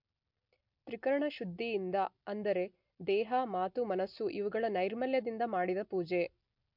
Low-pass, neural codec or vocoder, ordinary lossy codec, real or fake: 5.4 kHz; none; MP3, 48 kbps; real